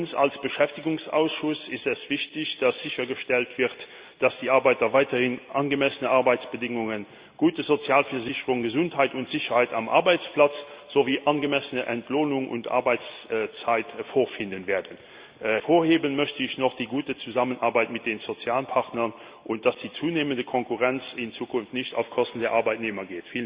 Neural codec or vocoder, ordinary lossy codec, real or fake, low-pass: none; Opus, 64 kbps; real; 3.6 kHz